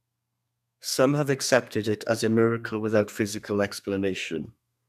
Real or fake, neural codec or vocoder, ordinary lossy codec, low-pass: fake; codec, 32 kHz, 1.9 kbps, SNAC; none; 14.4 kHz